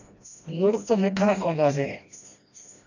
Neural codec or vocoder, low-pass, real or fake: codec, 16 kHz, 1 kbps, FreqCodec, smaller model; 7.2 kHz; fake